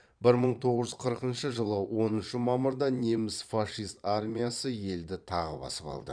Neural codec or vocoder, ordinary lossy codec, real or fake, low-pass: vocoder, 22.05 kHz, 80 mel bands, Vocos; none; fake; 9.9 kHz